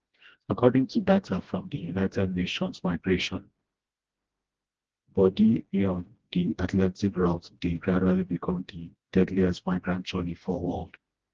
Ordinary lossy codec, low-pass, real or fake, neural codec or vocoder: Opus, 16 kbps; 7.2 kHz; fake; codec, 16 kHz, 1 kbps, FreqCodec, smaller model